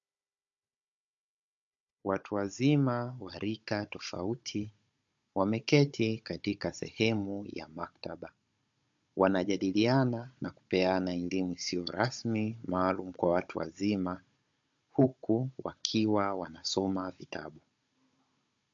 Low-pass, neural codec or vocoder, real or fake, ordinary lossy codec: 7.2 kHz; codec, 16 kHz, 16 kbps, FunCodec, trained on Chinese and English, 50 frames a second; fake; MP3, 48 kbps